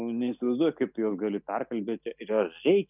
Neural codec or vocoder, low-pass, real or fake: none; 3.6 kHz; real